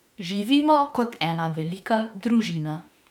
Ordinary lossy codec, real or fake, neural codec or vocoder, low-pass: none; fake; autoencoder, 48 kHz, 32 numbers a frame, DAC-VAE, trained on Japanese speech; 19.8 kHz